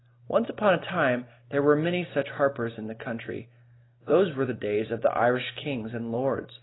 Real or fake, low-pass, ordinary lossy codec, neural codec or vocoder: real; 7.2 kHz; AAC, 16 kbps; none